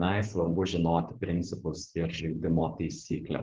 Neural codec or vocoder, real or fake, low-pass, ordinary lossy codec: codec, 16 kHz, 4.8 kbps, FACodec; fake; 7.2 kHz; Opus, 16 kbps